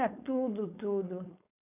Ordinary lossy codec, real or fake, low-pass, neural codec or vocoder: none; fake; 3.6 kHz; codec, 16 kHz, 4.8 kbps, FACodec